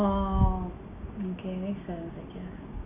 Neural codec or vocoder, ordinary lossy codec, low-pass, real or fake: none; AAC, 24 kbps; 3.6 kHz; real